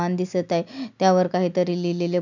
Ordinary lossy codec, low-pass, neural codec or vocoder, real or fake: none; 7.2 kHz; none; real